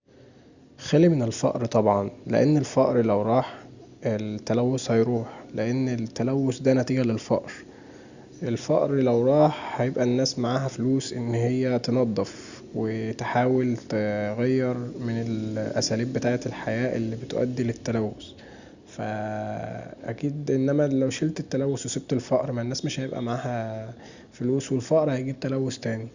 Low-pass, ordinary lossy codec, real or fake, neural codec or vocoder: 7.2 kHz; Opus, 64 kbps; real; none